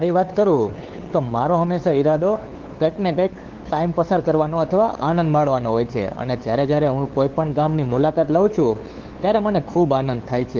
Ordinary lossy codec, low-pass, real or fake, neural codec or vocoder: Opus, 16 kbps; 7.2 kHz; fake; codec, 16 kHz, 2 kbps, FunCodec, trained on LibriTTS, 25 frames a second